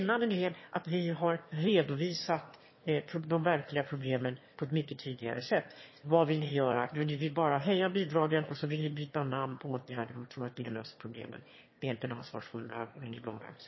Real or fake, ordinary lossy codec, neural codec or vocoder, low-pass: fake; MP3, 24 kbps; autoencoder, 22.05 kHz, a latent of 192 numbers a frame, VITS, trained on one speaker; 7.2 kHz